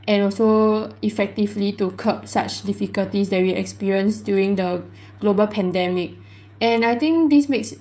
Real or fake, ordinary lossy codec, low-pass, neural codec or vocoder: fake; none; none; codec, 16 kHz, 16 kbps, FreqCodec, smaller model